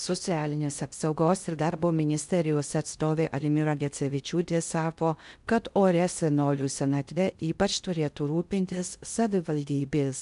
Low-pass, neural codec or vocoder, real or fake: 10.8 kHz; codec, 16 kHz in and 24 kHz out, 0.6 kbps, FocalCodec, streaming, 4096 codes; fake